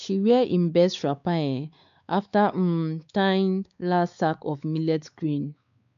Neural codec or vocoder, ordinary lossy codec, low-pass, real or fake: codec, 16 kHz, 4 kbps, X-Codec, WavLM features, trained on Multilingual LibriSpeech; none; 7.2 kHz; fake